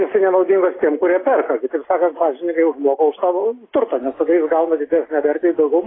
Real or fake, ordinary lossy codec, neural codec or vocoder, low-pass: real; AAC, 16 kbps; none; 7.2 kHz